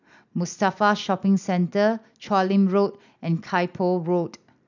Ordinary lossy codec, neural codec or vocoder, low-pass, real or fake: none; none; 7.2 kHz; real